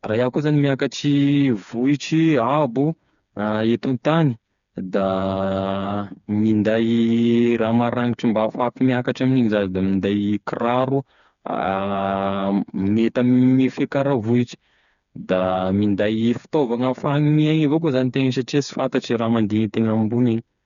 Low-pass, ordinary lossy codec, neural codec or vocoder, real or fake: 7.2 kHz; none; codec, 16 kHz, 4 kbps, FreqCodec, smaller model; fake